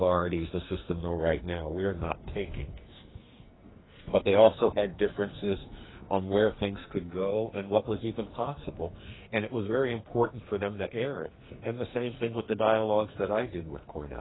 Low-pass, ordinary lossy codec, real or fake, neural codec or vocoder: 7.2 kHz; AAC, 16 kbps; fake; codec, 44.1 kHz, 2.6 kbps, SNAC